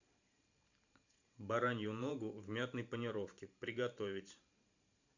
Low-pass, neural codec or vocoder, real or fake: 7.2 kHz; vocoder, 44.1 kHz, 128 mel bands every 512 samples, BigVGAN v2; fake